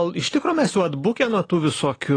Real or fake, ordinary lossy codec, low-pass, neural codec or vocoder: real; AAC, 32 kbps; 9.9 kHz; none